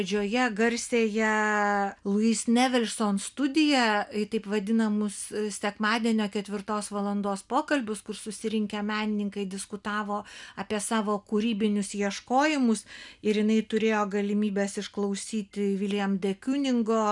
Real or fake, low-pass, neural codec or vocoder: real; 10.8 kHz; none